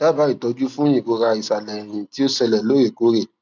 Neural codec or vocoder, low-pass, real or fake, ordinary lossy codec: none; 7.2 kHz; real; none